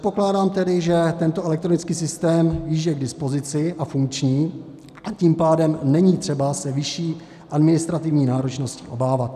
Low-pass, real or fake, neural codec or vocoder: 14.4 kHz; real; none